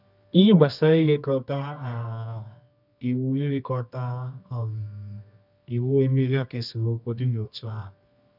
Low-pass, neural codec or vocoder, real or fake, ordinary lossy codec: 5.4 kHz; codec, 24 kHz, 0.9 kbps, WavTokenizer, medium music audio release; fake; none